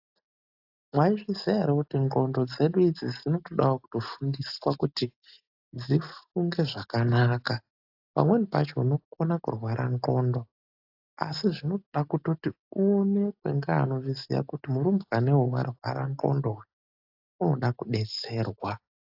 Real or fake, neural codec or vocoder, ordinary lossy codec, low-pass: real; none; AAC, 48 kbps; 5.4 kHz